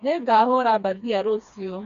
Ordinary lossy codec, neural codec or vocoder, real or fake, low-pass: none; codec, 16 kHz, 2 kbps, FreqCodec, smaller model; fake; 7.2 kHz